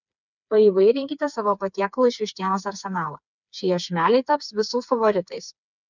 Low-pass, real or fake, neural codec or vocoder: 7.2 kHz; fake; codec, 16 kHz, 4 kbps, FreqCodec, smaller model